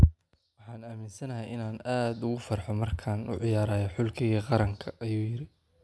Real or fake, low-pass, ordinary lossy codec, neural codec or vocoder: real; none; none; none